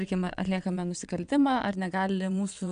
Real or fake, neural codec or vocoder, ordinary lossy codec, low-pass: fake; vocoder, 22.05 kHz, 80 mel bands, WaveNeXt; MP3, 96 kbps; 9.9 kHz